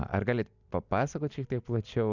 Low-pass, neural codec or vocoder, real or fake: 7.2 kHz; none; real